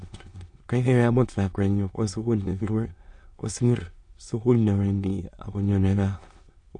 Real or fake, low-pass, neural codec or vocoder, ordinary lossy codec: fake; 9.9 kHz; autoencoder, 22.05 kHz, a latent of 192 numbers a frame, VITS, trained on many speakers; MP3, 48 kbps